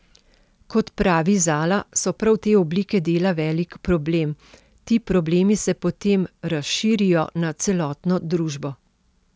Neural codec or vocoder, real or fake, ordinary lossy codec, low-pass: none; real; none; none